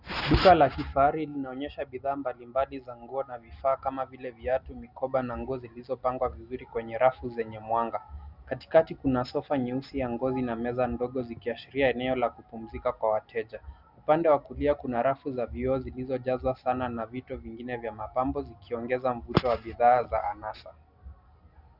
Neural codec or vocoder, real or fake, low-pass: none; real; 5.4 kHz